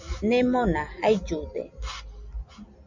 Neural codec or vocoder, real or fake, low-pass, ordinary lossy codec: none; real; 7.2 kHz; Opus, 64 kbps